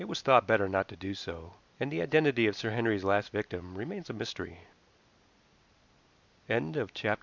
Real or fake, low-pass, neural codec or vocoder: real; 7.2 kHz; none